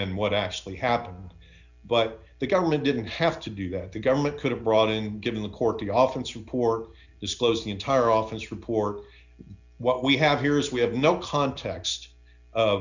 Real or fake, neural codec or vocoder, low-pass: real; none; 7.2 kHz